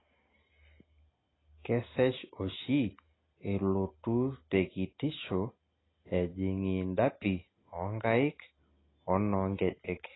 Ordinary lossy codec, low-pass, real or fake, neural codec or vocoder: AAC, 16 kbps; 7.2 kHz; real; none